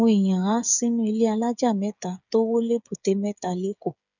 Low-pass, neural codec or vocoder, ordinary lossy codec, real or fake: 7.2 kHz; codec, 16 kHz, 8 kbps, FreqCodec, smaller model; none; fake